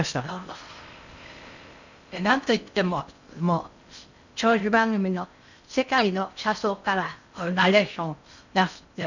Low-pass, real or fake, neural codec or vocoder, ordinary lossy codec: 7.2 kHz; fake; codec, 16 kHz in and 24 kHz out, 0.6 kbps, FocalCodec, streaming, 2048 codes; none